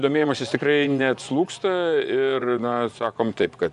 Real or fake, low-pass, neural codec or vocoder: fake; 10.8 kHz; vocoder, 24 kHz, 100 mel bands, Vocos